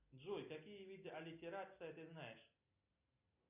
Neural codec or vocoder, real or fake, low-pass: none; real; 3.6 kHz